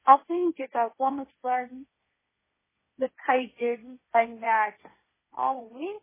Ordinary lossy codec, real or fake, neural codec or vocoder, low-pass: MP3, 16 kbps; fake; codec, 16 kHz, 1.1 kbps, Voila-Tokenizer; 3.6 kHz